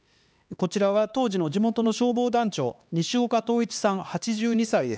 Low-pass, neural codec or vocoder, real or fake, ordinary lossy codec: none; codec, 16 kHz, 2 kbps, X-Codec, HuBERT features, trained on LibriSpeech; fake; none